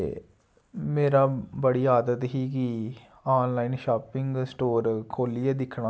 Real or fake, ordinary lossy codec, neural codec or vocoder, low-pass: real; none; none; none